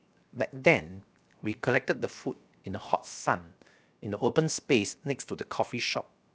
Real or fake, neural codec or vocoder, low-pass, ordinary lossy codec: fake; codec, 16 kHz, 0.7 kbps, FocalCodec; none; none